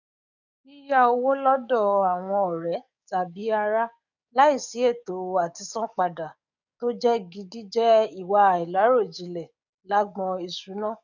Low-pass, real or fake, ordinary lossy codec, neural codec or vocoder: 7.2 kHz; fake; none; codec, 44.1 kHz, 7.8 kbps, DAC